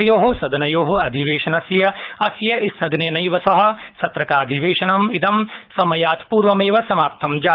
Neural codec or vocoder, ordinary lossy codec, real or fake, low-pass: codec, 24 kHz, 6 kbps, HILCodec; none; fake; 5.4 kHz